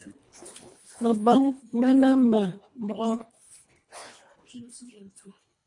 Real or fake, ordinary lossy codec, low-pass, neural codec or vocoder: fake; MP3, 48 kbps; 10.8 kHz; codec, 24 kHz, 1.5 kbps, HILCodec